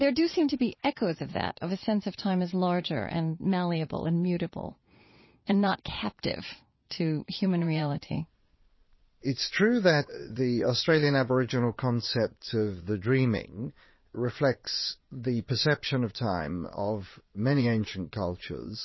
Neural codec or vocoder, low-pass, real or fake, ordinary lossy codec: vocoder, 22.05 kHz, 80 mel bands, Vocos; 7.2 kHz; fake; MP3, 24 kbps